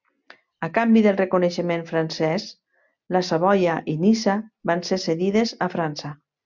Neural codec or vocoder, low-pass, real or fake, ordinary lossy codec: none; 7.2 kHz; real; MP3, 64 kbps